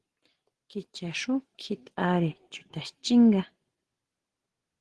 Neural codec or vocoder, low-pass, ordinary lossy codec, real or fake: none; 10.8 kHz; Opus, 16 kbps; real